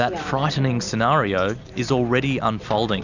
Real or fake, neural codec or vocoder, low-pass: real; none; 7.2 kHz